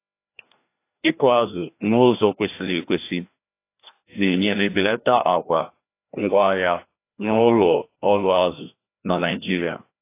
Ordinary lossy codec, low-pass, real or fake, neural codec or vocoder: AAC, 24 kbps; 3.6 kHz; fake; codec, 16 kHz, 1 kbps, FreqCodec, larger model